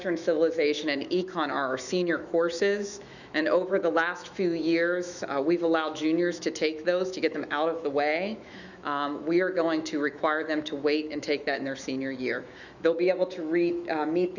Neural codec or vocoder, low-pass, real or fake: autoencoder, 48 kHz, 128 numbers a frame, DAC-VAE, trained on Japanese speech; 7.2 kHz; fake